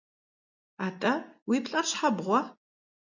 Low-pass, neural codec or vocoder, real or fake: 7.2 kHz; none; real